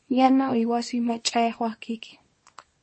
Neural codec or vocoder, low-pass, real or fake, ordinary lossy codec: codec, 24 kHz, 0.9 kbps, WavTokenizer, small release; 9.9 kHz; fake; MP3, 32 kbps